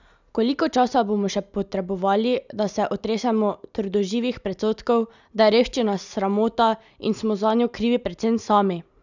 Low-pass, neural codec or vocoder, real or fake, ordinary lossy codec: 7.2 kHz; none; real; none